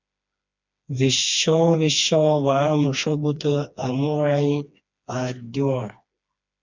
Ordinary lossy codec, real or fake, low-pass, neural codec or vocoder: MP3, 64 kbps; fake; 7.2 kHz; codec, 16 kHz, 2 kbps, FreqCodec, smaller model